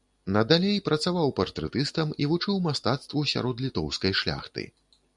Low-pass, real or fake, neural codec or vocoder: 10.8 kHz; real; none